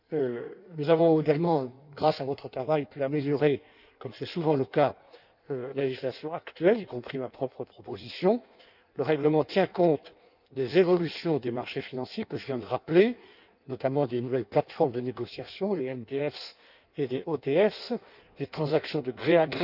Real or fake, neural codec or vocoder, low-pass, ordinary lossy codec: fake; codec, 16 kHz in and 24 kHz out, 1.1 kbps, FireRedTTS-2 codec; 5.4 kHz; none